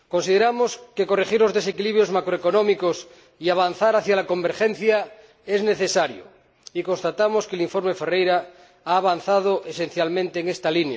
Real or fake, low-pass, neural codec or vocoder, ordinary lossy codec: real; none; none; none